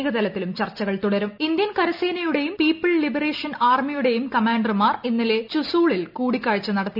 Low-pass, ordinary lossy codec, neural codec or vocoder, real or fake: 5.4 kHz; none; none; real